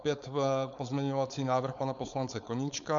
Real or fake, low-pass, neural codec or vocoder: fake; 7.2 kHz; codec, 16 kHz, 4.8 kbps, FACodec